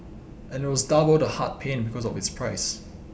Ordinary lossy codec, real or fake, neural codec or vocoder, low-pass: none; real; none; none